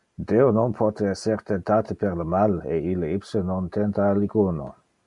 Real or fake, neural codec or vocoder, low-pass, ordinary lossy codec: real; none; 10.8 kHz; MP3, 96 kbps